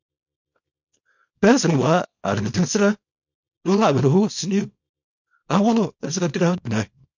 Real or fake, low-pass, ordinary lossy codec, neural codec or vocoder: fake; 7.2 kHz; MP3, 48 kbps; codec, 24 kHz, 0.9 kbps, WavTokenizer, small release